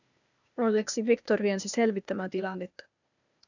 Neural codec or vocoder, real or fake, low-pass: codec, 16 kHz, 0.8 kbps, ZipCodec; fake; 7.2 kHz